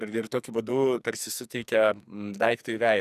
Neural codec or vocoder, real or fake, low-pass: codec, 44.1 kHz, 2.6 kbps, SNAC; fake; 14.4 kHz